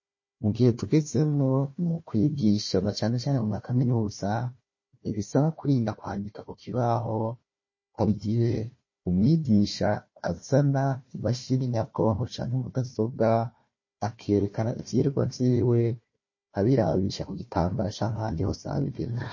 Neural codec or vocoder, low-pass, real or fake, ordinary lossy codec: codec, 16 kHz, 1 kbps, FunCodec, trained on Chinese and English, 50 frames a second; 7.2 kHz; fake; MP3, 32 kbps